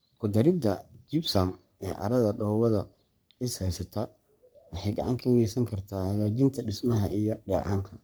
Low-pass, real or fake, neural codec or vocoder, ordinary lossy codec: none; fake; codec, 44.1 kHz, 3.4 kbps, Pupu-Codec; none